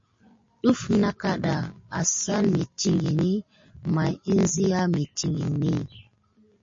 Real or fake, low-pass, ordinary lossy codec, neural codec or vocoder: real; 7.2 kHz; MP3, 32 kbps; none